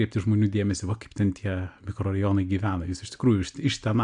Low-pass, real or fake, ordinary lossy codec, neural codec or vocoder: 9.9 kHz; real; AAC, 64 kbps; none